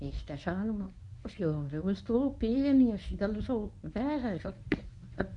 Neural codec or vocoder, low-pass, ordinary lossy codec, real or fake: codec, 24 kHz, 0.9 kbps, WavTokenizer, medium speech release version 1; 10.8 kHz; none; fake